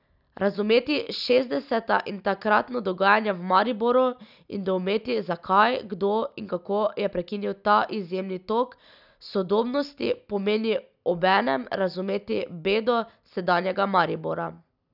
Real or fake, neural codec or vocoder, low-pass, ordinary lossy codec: real; none; 5.4 kHz; none